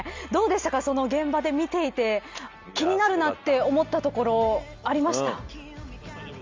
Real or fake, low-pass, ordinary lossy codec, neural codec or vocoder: real; 7.2 kHz; Opus, 32 kbps; none